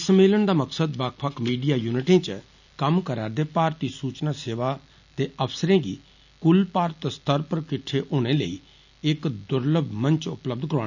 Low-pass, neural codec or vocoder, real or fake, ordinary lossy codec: 7.2 kHz; none; real; none